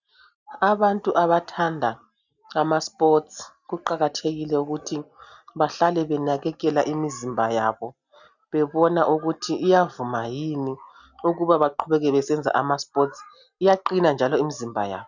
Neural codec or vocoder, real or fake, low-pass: none; real; 7.2 kHz